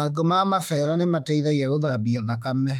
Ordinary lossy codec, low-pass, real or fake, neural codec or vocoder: none; 19.8 kHz; fake; autoencoder, 48 kHz, 32 numbers a frame, DAC-VAE, trained on Japanese speech